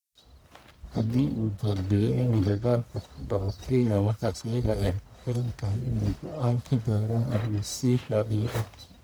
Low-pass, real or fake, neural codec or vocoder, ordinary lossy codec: none; fake; codec, 44.1 kHz, 1.7 kbps, Pupu-Codec; none